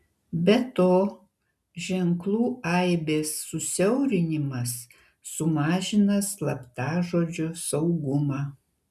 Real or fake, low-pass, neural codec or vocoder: real; 14.4 kHz; none